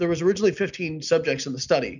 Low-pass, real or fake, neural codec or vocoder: 7.2 kHz; real; none